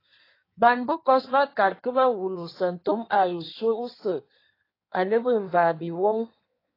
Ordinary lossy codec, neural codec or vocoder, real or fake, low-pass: AAC, 24 kbps; codec, 16 kHz in and 24 kHz out, 1.1 kbps, FireRedTTS-2 codec; fake; 5.4 kHz